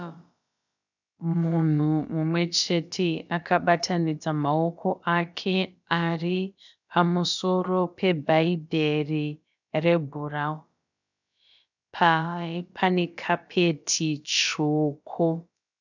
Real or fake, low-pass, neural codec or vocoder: fake; 7.2 kHz; codec, 16 kHz, about 1 kbps, DyCAST, with the encoder's durations